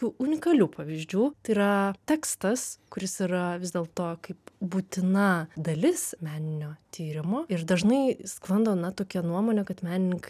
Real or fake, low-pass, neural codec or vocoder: real; 14.4 kHz; none